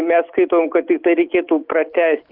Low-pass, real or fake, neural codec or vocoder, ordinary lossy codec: 5.4 kHz; real; none; Opus, 32 kbps